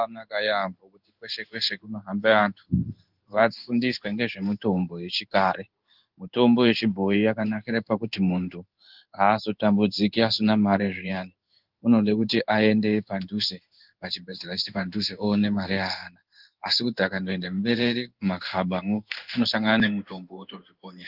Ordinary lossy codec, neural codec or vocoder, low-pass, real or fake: Opus, 24 kbps; codec, 16 kHz in and 24 kHz out, 1 kbps, XY-Tokenizer; 5.4 kHz; fake